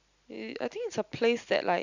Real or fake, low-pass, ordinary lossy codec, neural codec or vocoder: real; 7.2 kHz; none; none